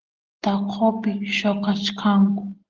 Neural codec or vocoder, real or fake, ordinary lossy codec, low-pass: none; real; Opus, 16 kbps; 7.2 kHz